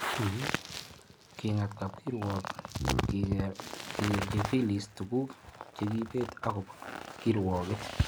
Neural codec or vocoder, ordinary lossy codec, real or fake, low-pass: none; none; real; none